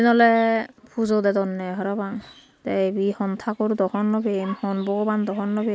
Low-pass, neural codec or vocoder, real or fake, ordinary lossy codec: none; none; real; none